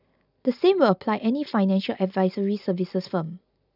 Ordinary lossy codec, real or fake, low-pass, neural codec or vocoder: none; real; 5.4 kHz; none